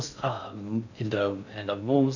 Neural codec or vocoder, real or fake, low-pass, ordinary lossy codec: codec, 16 kHz in and 24 kHz out, 0.6 kbps, FocalCodec, streaming, 4096 codes; fake; 7.2 kHz; none